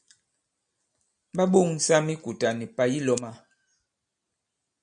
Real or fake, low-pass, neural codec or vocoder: real; 9.9 kHz; none